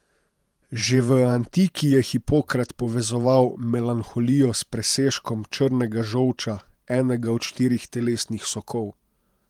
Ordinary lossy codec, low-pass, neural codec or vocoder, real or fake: Opus, 32 kbps; 19.8 kHz; vocoder, 48 kHz, 128 mel bands, Vocos; fake